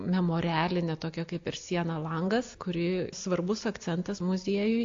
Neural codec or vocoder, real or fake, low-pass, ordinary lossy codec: none; real; 7.2 kHz; AAC, 48 kbps